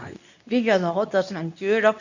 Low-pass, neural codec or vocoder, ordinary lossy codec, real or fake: 7.2 kHz; codec, 24 kHz, 0.9 kbps, WavTokenizer, medium speech release version 2; none; fake